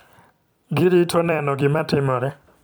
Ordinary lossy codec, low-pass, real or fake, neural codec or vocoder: none; none; fake; vocoder, 44.1 kHz, 128 mel bands every 256 samples, BigVGAN v2